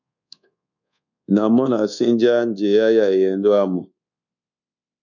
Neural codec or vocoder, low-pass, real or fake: codec, 24 kHz, 1.2 kbps, DualCodec; 7.2 kHz; fake